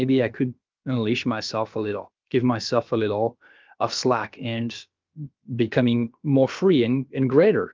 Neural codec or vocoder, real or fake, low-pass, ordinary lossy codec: codec, 16 kHz, about 1 kbps, DyCAST, with the encoder's durations; fake; 7.2 kHz; Opus, 24 kbps